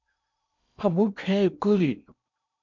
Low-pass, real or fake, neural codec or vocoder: 7.2 kHz; fake; codec, 16 kHz in and 24 kHz out, 0.8 kbps, FocalCodec, streaming, 65536 codes